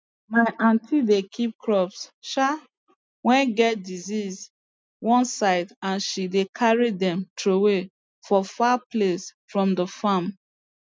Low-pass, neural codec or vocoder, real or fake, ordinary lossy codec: none; none; real; none